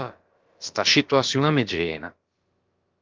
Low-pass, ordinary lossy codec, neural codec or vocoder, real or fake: 7.2 kHz; Opus, 32 kbps; codec, 16 kHz, about 1 kbps, DyCAST, with the encoder's durations; fake